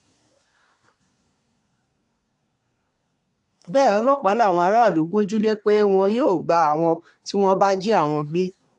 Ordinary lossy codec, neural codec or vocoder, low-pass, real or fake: AAC, 64 kbps; codec, 24 kHz, 1 kbps, SNAC; 10.8 kHz; fake